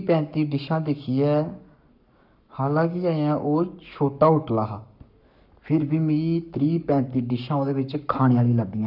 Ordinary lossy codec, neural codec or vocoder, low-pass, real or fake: none; codec, 44.1 kHz, 7.8 kbps, Pupu-Codec; 5.4 kHz; fake